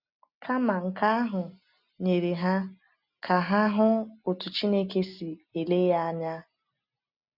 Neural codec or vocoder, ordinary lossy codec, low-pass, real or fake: none; none; 5.4 kHz; real